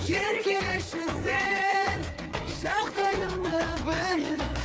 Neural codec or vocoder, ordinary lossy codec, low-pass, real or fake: codec, 16 kHz, 4 kbps, FreqCodec, larger model; none; none; fake